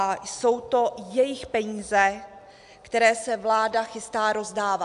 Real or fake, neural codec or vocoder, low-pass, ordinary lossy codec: real; none; 10.8 kHz; AAC, 96 kbps